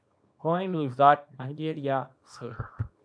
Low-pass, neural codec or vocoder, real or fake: 9.9 kHz; codec, 24 kHz, 0.9 kbps, WavTokenizer, small release; fake